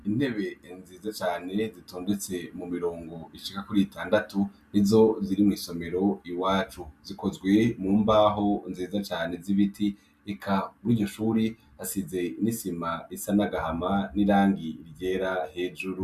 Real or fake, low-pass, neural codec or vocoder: real; 14.4 kHz; none